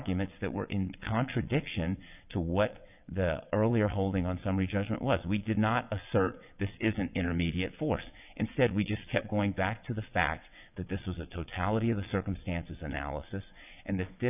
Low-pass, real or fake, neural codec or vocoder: 3.6 kHz; fake; vocoder, 22.05 kHz, 80 mel bands, WaveNeXt